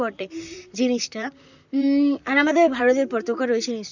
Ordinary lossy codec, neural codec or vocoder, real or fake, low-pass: none; vocoder, 44.1 kHz, 128 mel bands, Pupu-Vocoder; fake; 7.2 kHz